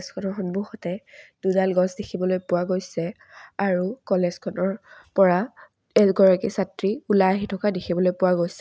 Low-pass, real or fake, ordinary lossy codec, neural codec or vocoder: none; real; none; none